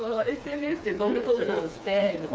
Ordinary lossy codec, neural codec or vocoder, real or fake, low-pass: none; codec, 16 kHz, 4 kbps, FreqCodec, smaller model; fake; none